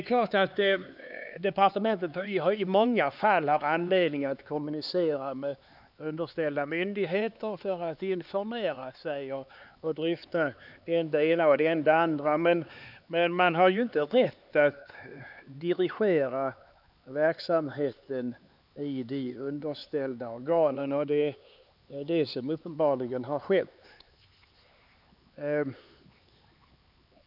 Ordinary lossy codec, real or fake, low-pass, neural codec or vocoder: none; fake; 5.4 kHz; codec, 16 kHz, 4 kbps, X-Codec, HuBERT features, trained on LibriSpeech